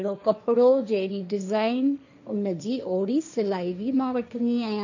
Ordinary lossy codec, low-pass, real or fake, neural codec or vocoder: none; 7.2 kHz; fake; codec, 16 kHz, 1.1 kbps, Voila-Tokenizer